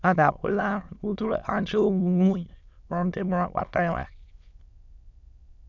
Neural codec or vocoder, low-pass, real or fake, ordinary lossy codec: autoencoder, 22.05 kHz, a latent of 192 numbers a frame, VITS, trained on many speakers; 7.2 kHz; fake; none